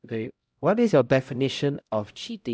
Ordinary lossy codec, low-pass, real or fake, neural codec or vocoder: none; none; fake; codec, 16 kHz, 0.5 kbps, X-Codec, HuBERT features, trained on LibriSpeech